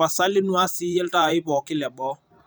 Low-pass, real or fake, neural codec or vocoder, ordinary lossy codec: none; fake; vocoder, 44.1 kHz, 128 mel bands every 512 samples, BigVGAN v2; none